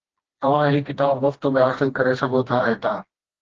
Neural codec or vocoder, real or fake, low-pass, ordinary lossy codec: codec, 16 kHz, 1 kbps, FreqCodec, smaller model; fake; 7.2 kHz; Opus, 16 kbps